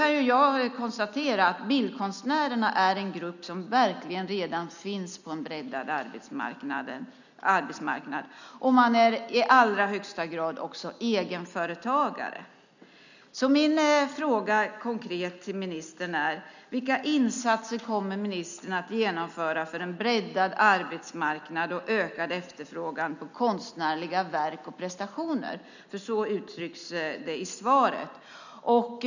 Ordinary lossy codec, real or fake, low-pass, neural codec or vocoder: none; real; 7.2 kHz; none